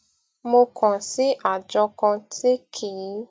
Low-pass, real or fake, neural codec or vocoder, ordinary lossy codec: none; real; none; none